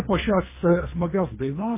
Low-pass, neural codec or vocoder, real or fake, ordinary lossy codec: 3.6 kHz; codec, 24 kHz, 3 kbps, HILCodec; fake; MP3, 16 kbps